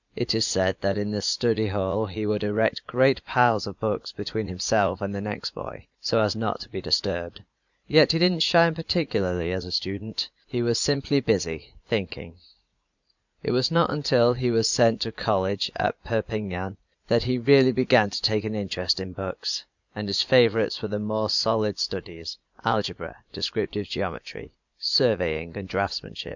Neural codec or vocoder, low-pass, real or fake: vocoder, 44.1 kHz, 80 mel bands, Vocos; 7.2 kHz; fake